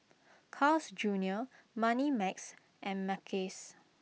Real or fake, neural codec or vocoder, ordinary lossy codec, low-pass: real; none; none; none